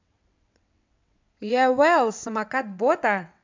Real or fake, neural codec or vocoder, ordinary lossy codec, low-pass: fake; vocoder, 22.05 kHz, 80 mel bands, WaveNeXt; MP3, 64 kbps; 7.2 kHz